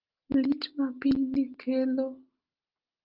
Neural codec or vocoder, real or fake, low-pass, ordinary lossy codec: none; real; 5.4 kHz; Opus, 32 kbps